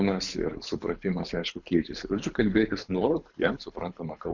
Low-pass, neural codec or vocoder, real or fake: 7.2 kHz; codec, 24 kHz, 6 kbps, HILCodec; fake